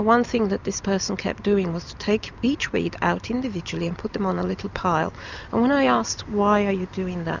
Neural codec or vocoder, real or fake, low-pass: none; real; 7.2 kHz